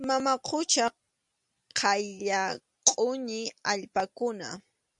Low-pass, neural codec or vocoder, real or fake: 10.8 kHz; none; real